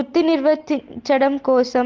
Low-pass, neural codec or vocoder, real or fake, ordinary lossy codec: 7.2 kHz; none; real; Opus, 16 kbps